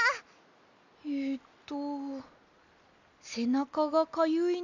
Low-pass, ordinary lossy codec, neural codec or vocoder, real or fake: 7.2 kHz; none; none; real